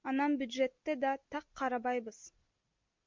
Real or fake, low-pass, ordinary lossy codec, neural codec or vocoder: real; 7.2 kHz; MP3, 48 kbps; none